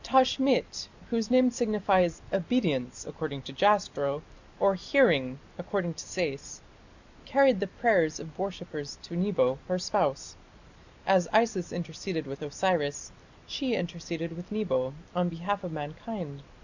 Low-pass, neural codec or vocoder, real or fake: 7.2 kHz; none; real